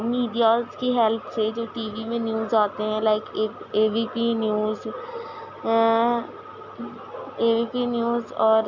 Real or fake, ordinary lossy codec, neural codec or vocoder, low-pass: real; none; none; 7.2 kHz